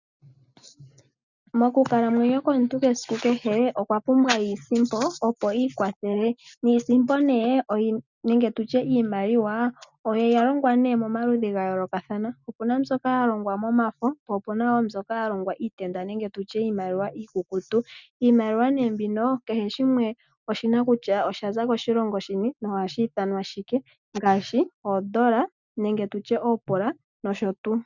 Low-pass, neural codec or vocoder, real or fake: 7.2 kHz; none; real